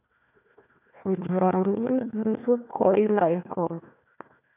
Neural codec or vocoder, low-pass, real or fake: codec, 16 kHz, 1 kbps, FunCodec, trained on Chinese and English, 50 frames a second; 3.6 kHz; fake